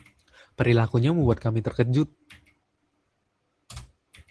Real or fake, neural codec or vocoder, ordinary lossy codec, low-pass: real; none; Opus, 16 kbps; 10.8 kHz